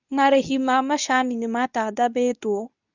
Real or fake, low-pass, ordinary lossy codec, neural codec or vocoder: fake; 7.2 kHz; none; codec, 24 kHz, 0.9 kbps, WavTokenizer, medium speech release version 2